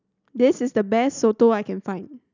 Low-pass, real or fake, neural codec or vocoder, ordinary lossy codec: 7.2 kHz; real; none; none